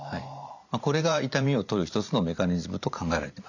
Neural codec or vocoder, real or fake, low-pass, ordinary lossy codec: vocoder, 44.1 kHz, 128 mel bands every 512 samples, BigVGAN v2; fake; 7.2 kHz; none